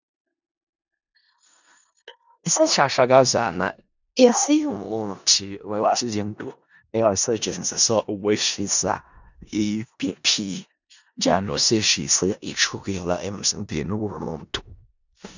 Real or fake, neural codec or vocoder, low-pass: fake; codec, 16 kHz in and 24 kHz out, 0.4 kbps, LongCat-Audio-Codec, four codebook decoder; 7.2 kHz